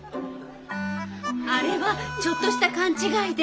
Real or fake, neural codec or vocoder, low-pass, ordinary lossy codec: real; none; none; none